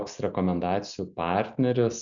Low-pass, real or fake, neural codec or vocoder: 7.2 kHz; real; none